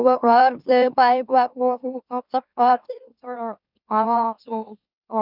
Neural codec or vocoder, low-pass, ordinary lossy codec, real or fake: autoencoder, 44.1 kHz, a latent of 192 numbers a frame, MeloTTS; 5.4 kHz; none; fake